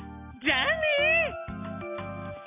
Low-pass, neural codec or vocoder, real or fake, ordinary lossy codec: 3.6 kHz; none; real; none